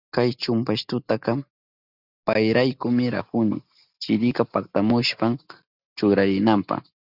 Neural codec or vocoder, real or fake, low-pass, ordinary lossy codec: none; real; 5.4 kHz; Opus, 64 kbps